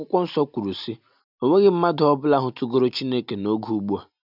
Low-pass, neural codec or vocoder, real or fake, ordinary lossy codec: 5.4 kHz; none; real; none